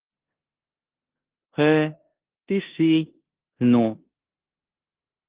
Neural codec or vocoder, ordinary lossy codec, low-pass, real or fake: codec, 16 kHz in and 24 kHz out, 0.9 kbps, LongCat-Audio-Codec, four codebook decoder; Opus, 16 kbps; 3.6 kHz; fake